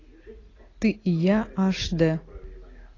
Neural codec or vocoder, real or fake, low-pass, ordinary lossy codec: none; real; 7.2 kHz; AAC, 32 kbps